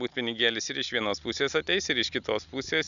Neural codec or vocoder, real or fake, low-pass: none; real; 7.2 kHz